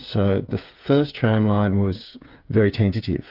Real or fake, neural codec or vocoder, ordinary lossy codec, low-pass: fake; codec, 16 kHz, 8 kbps, FreqCodec, smaller model; Opus, 24 kbps; 5.4 kHz